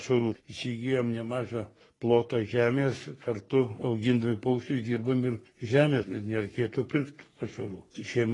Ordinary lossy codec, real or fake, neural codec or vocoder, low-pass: AAC, 32 kbps; fake; codec, 44.1 kHz, 3.4 kbps, Pupu-Codec; 10.8 kHz